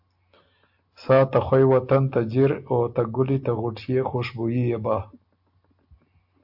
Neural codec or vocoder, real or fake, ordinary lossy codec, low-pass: none; real; AAC, 48 kbps; 5.4 kHz